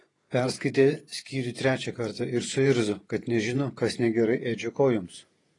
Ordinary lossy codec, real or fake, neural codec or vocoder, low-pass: AAC, 32 kbps; fake; vocoder, 48 kHz, 128 mel bands, Vocos; 10.8 kHz